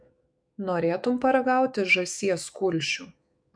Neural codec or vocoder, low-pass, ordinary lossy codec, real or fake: autoencoder, 48 kHz, 128 numbers a frame, DAC-VAE, trained on Japanese speech; 9.9 kHz; MP3, 64 kbps; fake